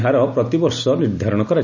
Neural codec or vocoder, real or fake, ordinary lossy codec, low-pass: none; real; none; 7.2 kHz